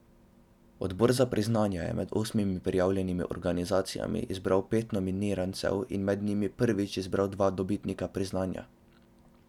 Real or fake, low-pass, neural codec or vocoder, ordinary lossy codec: real; 19.8 kHz; none; none